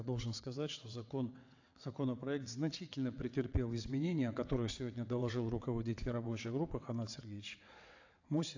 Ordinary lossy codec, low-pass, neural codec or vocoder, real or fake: AAC, 48 kbps; 7.2 kHz; vocoder, 22.05 kHz, 80 mel bands, WaveNeXt; fake